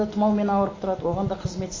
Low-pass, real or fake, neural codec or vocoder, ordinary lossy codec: 7.2 kHz; real; none; MP3, 32 kbps